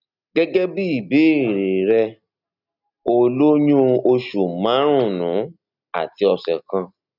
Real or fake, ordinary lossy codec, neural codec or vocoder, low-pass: real; none; none; 5.4 kHz